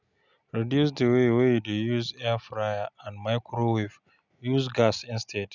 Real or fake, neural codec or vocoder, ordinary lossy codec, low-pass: real; none; none; 7.2 kHz